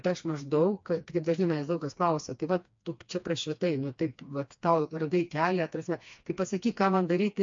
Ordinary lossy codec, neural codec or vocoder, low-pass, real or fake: MP3, 48 kbps; codec, 16 kHz, 2 kbps, FreqCodec, smaller model; 7.2 kHz; fake